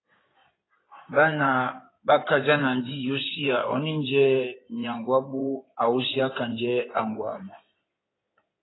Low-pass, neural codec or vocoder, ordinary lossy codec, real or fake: 7.2 kHz; codec, 16 kHz in and 24 kHz out, 2.2 kbps, FireRedTTS-2 codec; AAC, 16 kbps; fake